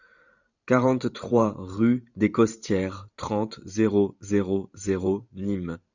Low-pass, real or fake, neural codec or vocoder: 7.2 kHz; fake; vocoder, 44.1 kHz, 128 mel bands every 512 samples, BigVGAN v2